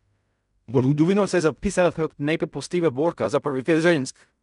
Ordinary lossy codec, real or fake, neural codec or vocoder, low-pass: none; fake; codec, 16 kHz in and 24 kHz out, 0.4 kbps, LongCat-Audio-Codec, fine tuned four codebook decoder; 10.8 kHz